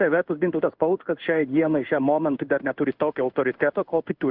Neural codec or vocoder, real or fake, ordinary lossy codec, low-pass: codec, 16 kHz in and 24 kHz out, 1 kbps, XY-Tokenizer; fake; Opus, 32 kbps; 5.4 kHz